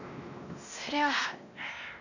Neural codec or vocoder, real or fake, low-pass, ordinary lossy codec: codec, 16 kHz, 1 kbps, X-Codec, WavLM features, trained on Multilingual LibriSpeech; fake; 7.2 kHz; none